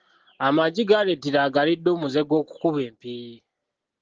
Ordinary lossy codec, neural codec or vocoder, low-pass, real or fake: Opus, 16 kbps; none; 7.2 kHz; real